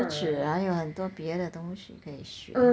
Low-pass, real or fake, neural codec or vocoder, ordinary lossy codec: none; real; none; none